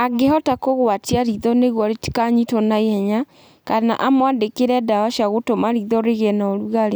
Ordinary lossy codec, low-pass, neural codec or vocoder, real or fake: none; none; none; real